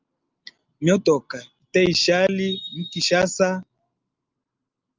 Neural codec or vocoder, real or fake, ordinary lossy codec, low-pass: none; real; Opus, 24 kbps; 7.2 kHz